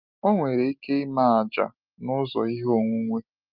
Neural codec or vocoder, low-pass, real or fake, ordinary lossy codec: none; 5.4 kHz; real; Opus, 24 kbps